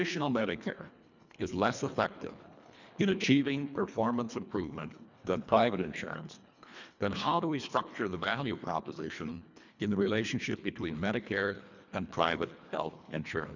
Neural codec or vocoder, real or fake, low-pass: codec, 24 kHz, 1.5 kbps, HILCodec; fake; 7.2 kHz